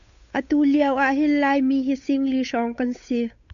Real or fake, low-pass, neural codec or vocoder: fake; 7.2 kHz; codec, 16 kHz, 8 kbps, FunCodec, trained on Chinese and English, 25 frames a second